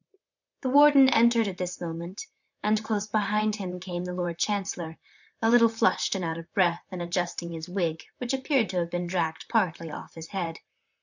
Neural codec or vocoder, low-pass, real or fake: vocoder, 44.1 kHz, 128 mel bands, Pupu-Vocoder; 7.2 kHz; fake